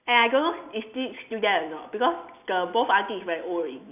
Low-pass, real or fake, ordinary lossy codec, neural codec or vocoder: 3.6 kHz; real; none; none